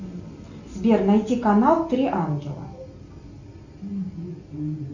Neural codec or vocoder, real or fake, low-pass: none; real; 7.2 kHz